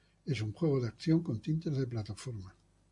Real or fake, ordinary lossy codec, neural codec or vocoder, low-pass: real; MP3, 64 kbps; none; 10.8 kHz